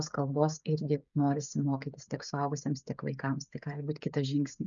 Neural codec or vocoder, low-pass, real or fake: codec, 16 kHz, 16 kbps, FreqCodec, smaller model; 7.2 kHz; fake